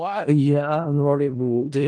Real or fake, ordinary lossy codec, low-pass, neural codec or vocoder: fake; Opus, 24 kbps; 9.9 kHz; codec, 16 kHz in and 24 kHz out, 0.4 kbps, LongCat-Audio-Codec, four codebook decoder